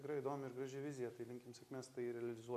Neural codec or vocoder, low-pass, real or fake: none; 14.4 kHz; real